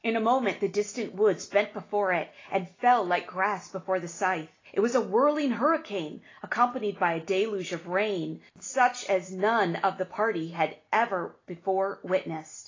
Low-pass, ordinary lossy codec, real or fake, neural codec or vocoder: 7.2 kHz; AAC, 32 kbps; real; none